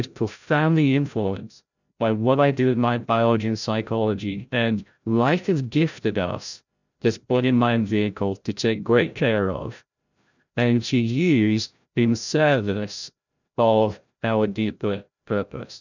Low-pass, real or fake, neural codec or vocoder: 7.2 kHz; fake; codec, 16 kHz, 0.5 kbps, FreqCodec, larger model